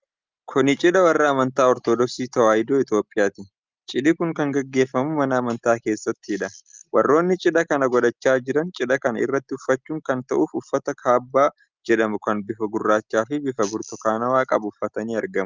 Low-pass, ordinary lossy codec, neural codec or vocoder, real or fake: 7.2 kHz; Opus, 24 kbps; none; real